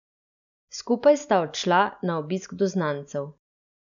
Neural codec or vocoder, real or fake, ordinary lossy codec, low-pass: none; real; none; 7.2 kHz